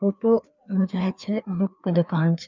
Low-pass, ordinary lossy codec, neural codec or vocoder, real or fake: 7.2 kHz; none; codec, 16 kHz, 2 kbps, FreqCodec, larger model; fake